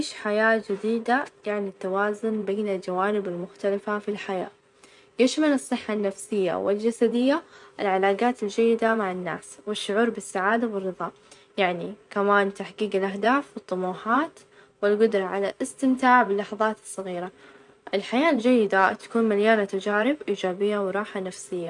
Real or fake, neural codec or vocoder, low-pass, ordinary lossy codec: fake; vocoder, 24 kHz, 100 mel bands, Vocos; 10.8 kHz; none